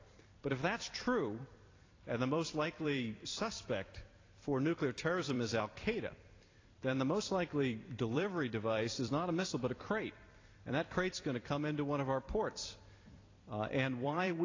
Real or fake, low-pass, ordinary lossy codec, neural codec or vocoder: fake; 7.2 kHz; AAC, 32 kbps; vocoder, 44.1 kHz, 128 mel bands every 256 samples, BigVGAN v2